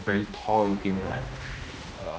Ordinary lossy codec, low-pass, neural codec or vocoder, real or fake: none; none; codec, 16 kHz, 1 kbps, X-Codec, HuBERT features, trained on general audio; fake